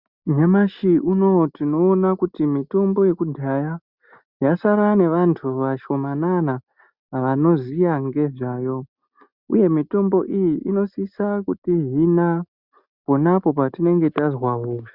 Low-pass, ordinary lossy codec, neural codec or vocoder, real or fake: 5.4 kHz; Opus, 64 kbps; none; real